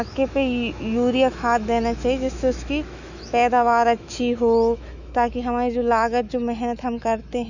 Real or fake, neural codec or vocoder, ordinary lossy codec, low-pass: fake; autoencoder, 48 kHz, 128 numbers a frame, DAC-VAE, trained on Japanese speech; none; 7.2 kHz